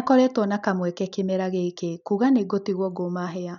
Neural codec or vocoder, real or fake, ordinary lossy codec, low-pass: none; real; none; 7.2 kHz